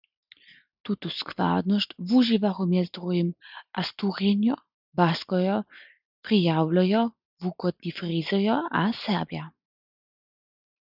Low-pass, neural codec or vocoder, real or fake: 5.4 kHz; none; real